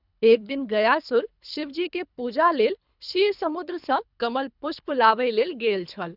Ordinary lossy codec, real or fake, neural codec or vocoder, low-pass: none; fake; codec, 24 kHz, 3 kbps, HILCodec; 5.4 kHz